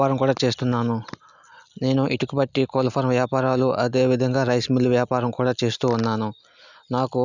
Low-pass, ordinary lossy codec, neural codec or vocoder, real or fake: 7.2 kHz; none; none; real